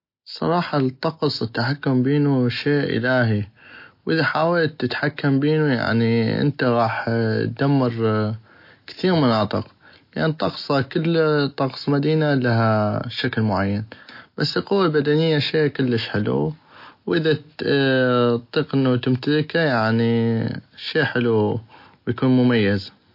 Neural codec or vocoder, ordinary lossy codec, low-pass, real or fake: none; MP3, 32 kbps; 5.4 kHz; real